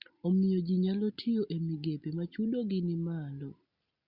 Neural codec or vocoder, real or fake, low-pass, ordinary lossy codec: none; real; 5.4 kHz; Opus, 64 kbps